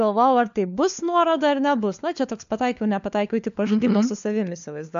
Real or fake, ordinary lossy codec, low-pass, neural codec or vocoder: fake; MP3, 64 kbps; 7.2 kHz; codec, 16 kHz, 4 kbps, FunCodec, trained on LibriTTS, 50 frames a second